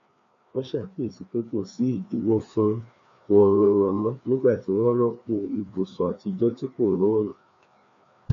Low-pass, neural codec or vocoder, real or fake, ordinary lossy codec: 7.2 kHz; codec, 16 kHz, 2 kbps, FreqCodec, larger model; fake; AAC, 48 kbps